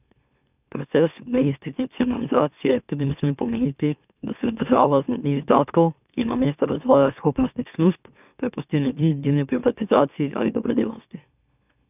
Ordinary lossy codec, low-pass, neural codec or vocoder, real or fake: none; 3.6 kHz; autoencoder, 44.1 kHz, a latent of 192 numbers a frame, MeloTTS; fake